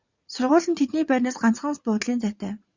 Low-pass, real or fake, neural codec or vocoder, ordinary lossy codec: 7.2 kHz; fake; vocoder, 44.1 kHz, 80 mel bands, Vocos; Opus, 64 kbps